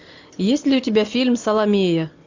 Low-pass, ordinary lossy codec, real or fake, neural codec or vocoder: 7.2 kHz; AAC, 48 kbps; real; none